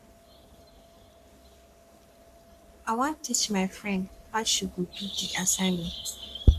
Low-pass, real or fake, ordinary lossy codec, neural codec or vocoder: 14.4 kHz; fake; none; codec, 44.1 kHz, 3.4 kbps, Pupu-Codec